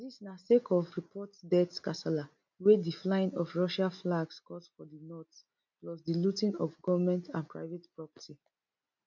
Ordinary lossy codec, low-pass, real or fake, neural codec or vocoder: none; 7.2 kHz; real; none